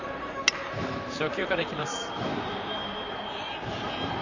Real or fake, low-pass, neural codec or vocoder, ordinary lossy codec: fake; 7.2 kHz; vocoder, 44.1 kHz, 128 mel bands, Pupu-Vocoder; none